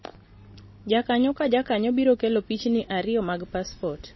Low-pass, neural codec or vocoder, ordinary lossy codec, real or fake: 7.2 kHz; none; MP3, 24 kbps; real